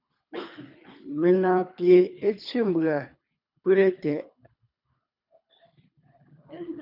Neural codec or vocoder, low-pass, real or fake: codec, 24 kHz, 3 kbps, HILCodec; 5.4 kHz; fake